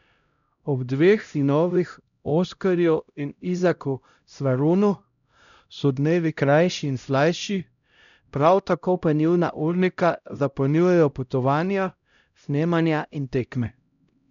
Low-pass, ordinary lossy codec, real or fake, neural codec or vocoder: 7.2 kHz; none; fake; codec, 16 kHz, 0.5 kbps, X-Codec, HuBERT features, trained on LibriSpeech